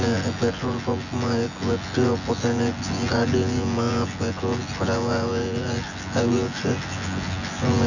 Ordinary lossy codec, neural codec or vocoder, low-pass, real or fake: none; vocoder, 24 kHz, 100 mel bands, Vocos; 7.2 kHz; fake